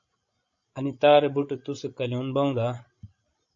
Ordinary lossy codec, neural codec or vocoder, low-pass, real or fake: AAC, 48 kbps; codec, 16 kHz, 16 kbps, FreqCodec, larger model; 7.2 kHz; fake